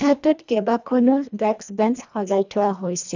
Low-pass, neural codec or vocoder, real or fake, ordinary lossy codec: 7.2 kHz; codec, 24 kHz, 1.5 kbps, HILCodec; fake; none